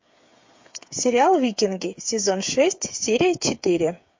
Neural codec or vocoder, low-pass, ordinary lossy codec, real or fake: codec, 16 kHz, 8 kbps, FreqCodec, smaller model; 7.2 kHz; MP3, 48 kbps; fake